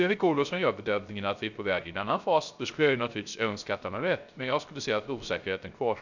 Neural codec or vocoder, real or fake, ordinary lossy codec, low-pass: codec, 16 kHz, 0.3 kbps, FocalCodec; fake; none; 7.2 kHz